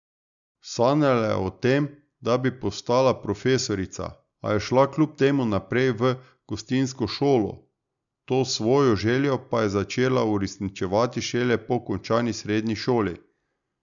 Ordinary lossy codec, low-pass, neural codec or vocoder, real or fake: none; 7.2 kHz; none; real